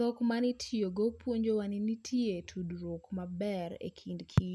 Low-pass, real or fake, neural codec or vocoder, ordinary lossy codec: none; real; none; none